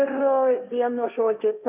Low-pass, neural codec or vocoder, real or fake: 3.6 kHz; codec, 16 kHz, 1.1 kbps, Voila-Tokenizer; fake